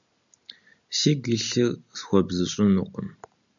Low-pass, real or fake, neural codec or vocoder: 7.2 kHz; real; none